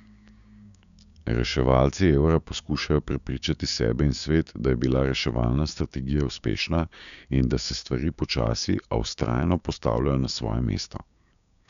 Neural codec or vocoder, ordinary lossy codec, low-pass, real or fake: codec, 16 kHz, 6 kbps, DAC; none; 7.2 kHz; fake